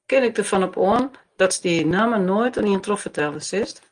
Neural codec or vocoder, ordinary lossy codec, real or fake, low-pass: none; Opus, 24 kbps; real; 9.9 kHz